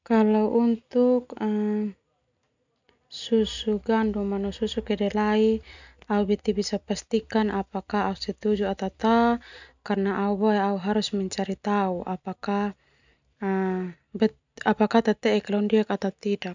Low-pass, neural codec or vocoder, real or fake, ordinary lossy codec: 7.2 kHz; none; real; none